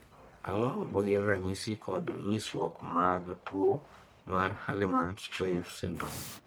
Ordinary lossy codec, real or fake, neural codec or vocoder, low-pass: none; fake; codec, 44.1 kHz, 1.7 kbps, Pupu-Codec; none